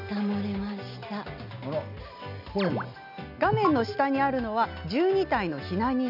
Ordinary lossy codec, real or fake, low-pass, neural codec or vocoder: none; real; 5.4 kHz; none